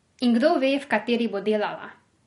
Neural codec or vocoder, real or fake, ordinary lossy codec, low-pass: vocoder, 44.1 kHz, 128 mel bands every 256 samples, BigVGAN v2; fake; MP3, 48 kbps; 19.8 kHz